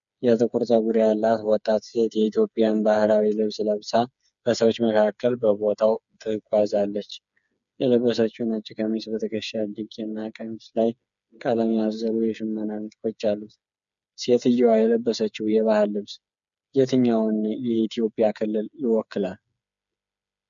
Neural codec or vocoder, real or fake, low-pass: codec, 16 kHz, 4 kbps, FreqCodec, smaller model; fake; 7.2 kHz